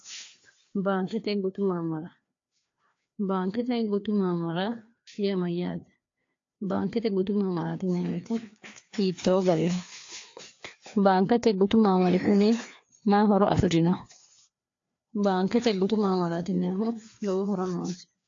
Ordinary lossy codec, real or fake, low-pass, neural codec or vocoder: AAC, 48 kbps; fake; 7.2 kHz; codec, 16 kHz, 2 kbps, FreqCodec, larger model